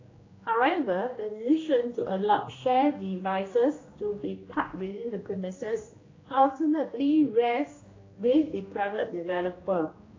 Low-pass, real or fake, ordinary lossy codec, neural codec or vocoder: 7.2 kHz; fake; AAC, 32 kbps; codec, 16 kHz, 1 kbps, X-Codec, HuBERT features, trained on general audio